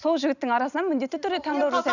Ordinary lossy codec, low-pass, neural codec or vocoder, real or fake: none; 7.2 kHz; none; real